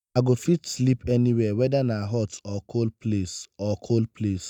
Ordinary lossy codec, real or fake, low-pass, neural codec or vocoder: none; real; 19.8 kHz; none